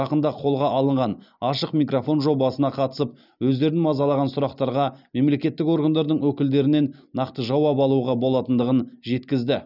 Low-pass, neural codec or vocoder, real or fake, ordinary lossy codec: 5.4 kHz; none; real; none